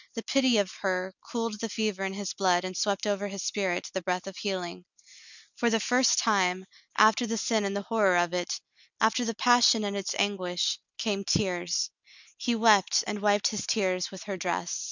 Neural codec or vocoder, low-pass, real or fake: none; 7.2 kHz; real